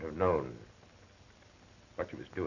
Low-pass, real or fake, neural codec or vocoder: 7.2 kHz; real; none